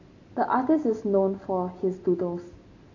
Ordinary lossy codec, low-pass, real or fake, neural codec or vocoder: AAC, 48 kbps; 7.2 kHz; real; none